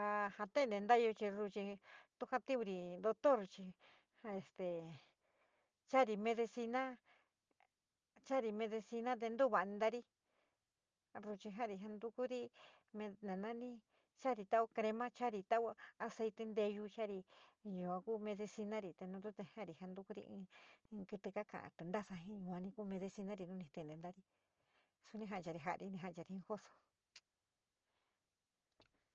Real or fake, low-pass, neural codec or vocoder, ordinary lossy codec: real; 7.2 kHz; none; Opus, 24 kbps